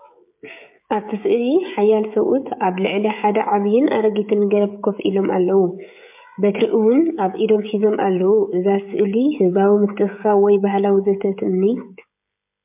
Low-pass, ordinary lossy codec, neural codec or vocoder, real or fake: 3.6 kHz; MP3, 32 kbps; codec, 16 kHz, 16 kbps, FreqCodec, smaller model; fake